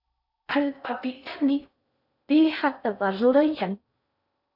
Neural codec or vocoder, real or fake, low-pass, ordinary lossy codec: codec, 16 kHz in and 24 kHz out, 0.6 kbps, FocalCodec, streaming, 4096 codes; fake; 5.4 kHz; AAC, 48 kbps